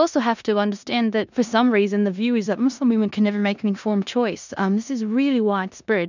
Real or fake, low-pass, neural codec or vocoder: fake; 7.2 kHz; codec, 16 kHz in and 24 kHz out, 0.9 kbps, LongCat-Audio-Codec, four codebook decoder